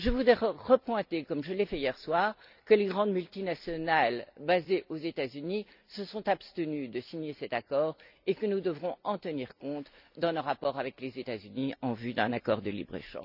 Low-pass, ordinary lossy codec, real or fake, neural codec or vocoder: 5.4 kHz; none; real; none